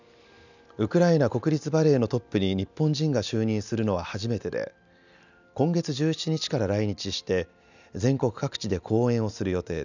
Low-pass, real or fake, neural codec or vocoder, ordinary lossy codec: 7.2 kHz; real; none; none